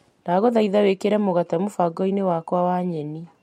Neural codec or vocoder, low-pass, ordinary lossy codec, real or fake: none; 19.8 kHz; MP3, 64 kbps; real